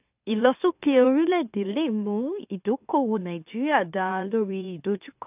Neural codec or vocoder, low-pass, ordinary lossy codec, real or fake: autoencoder, 44.1 kHz, a latent of 192 numbers a frame, MeloTTS; 3.6 kHz; AAC, 32 kbps; fake